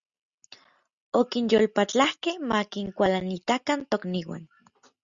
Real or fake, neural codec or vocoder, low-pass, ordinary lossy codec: real; none; 7.2 kHz; Opus, 64 kbps